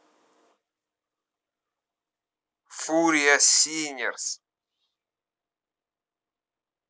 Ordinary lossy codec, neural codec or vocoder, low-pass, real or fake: none; none; none; real